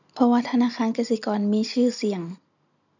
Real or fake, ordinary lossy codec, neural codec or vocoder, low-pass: real; none; none; 7.2 kHz